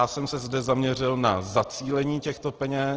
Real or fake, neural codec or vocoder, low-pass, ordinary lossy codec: real; none; 7.2 kHz; Opus, 16 kbps